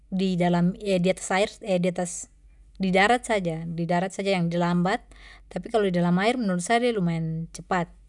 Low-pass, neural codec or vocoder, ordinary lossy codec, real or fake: 10.8 kHz; none; none; real